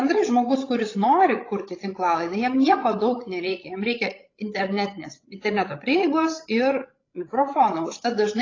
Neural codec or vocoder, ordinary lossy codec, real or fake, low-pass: codec, 16 kHz, 16 kbps, FreqCodec, larger model; AAC, 32 kbps; fake; 7.2 kHz